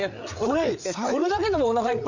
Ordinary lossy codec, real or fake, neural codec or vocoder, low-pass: none; fake; codec, 16 kHz, 8 kbps, FreqCodec, larger model; 7.2 kHz